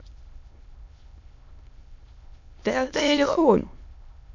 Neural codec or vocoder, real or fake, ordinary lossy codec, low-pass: autoencoder, 22.05 kHz, a latent of 192 numbers a frame, VITS, trained on many speakers; fake; none; 7.2 kHz